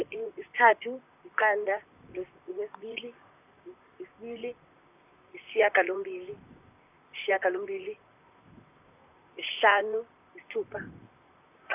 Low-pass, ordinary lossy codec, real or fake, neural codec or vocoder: 3.6 kHz; none; fake; vocoder, 44.1 kHz, 128 mel bands, Pupu-Vocoder